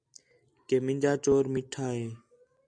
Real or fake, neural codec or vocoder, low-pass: real; none; 9.9 kHz